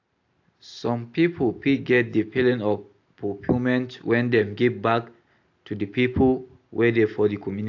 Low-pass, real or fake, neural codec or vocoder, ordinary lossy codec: 7.2 kHz; real; none; AAC, 48 kbps